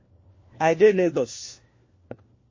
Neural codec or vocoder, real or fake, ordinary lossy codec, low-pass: codec, 16 kHz, 1 kbps, FunCodec, trained on LibriTTS, 50 frames a second; fake; MP3, 32 kbps; 7.2 kHz